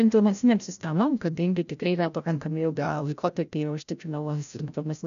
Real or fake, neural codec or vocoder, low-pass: fake; codec, 16 kHz, 0.5 kbps, FreqCodec, larger model; 7.2 kHz